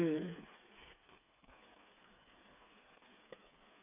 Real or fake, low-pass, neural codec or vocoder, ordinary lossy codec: fake; 3.6 kHz; codec, 16 kHz, 4 kbps, FreqCodec, smaller model; AAC, 16 kbps